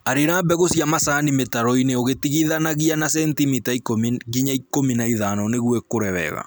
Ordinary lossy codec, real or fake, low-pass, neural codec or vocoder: none; real; none; none